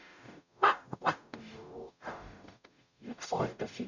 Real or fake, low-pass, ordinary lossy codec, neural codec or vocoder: fake; 7.2 kHz; none; codec, 44.1 kHz, 0.9 kbps, DAC